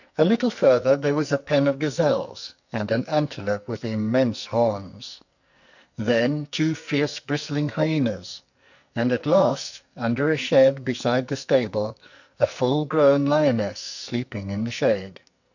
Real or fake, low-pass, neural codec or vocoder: fake; 7.2 kHz; codec, 44.1 kHz, 2.6 kbps, SNAC